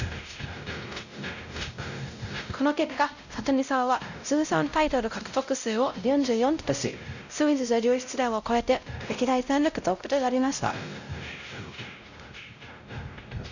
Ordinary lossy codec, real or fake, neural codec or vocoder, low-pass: none; fake; codec, 16 kHz, 0.5 kbps, X-Codec, WavLM features, trained on Multilingual LibriSpeech; 7.2 kHz